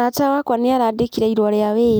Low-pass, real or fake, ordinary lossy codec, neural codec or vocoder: none; real; none; none